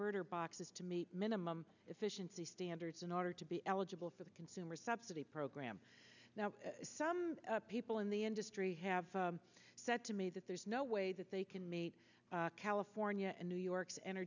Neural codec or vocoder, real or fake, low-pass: none; real; 7.2 kHz